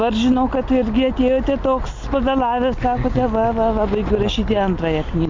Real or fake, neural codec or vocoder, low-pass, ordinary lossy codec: real; none; 7.2 kHz; MP3, 64 kbps